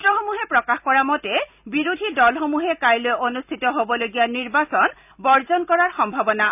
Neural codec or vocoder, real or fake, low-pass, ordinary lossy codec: none; real; 3.6 kHz; none